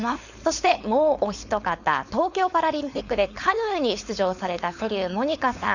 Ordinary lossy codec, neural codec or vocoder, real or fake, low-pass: none; codec, 16 kHz, 4.8 kbps, FACodec; fake; 7.2 kHz